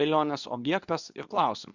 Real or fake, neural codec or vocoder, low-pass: fake; codec, 24 kHz, 0.9 kbps, WavTokenizer, medium speech release version 2; 7.2 kHz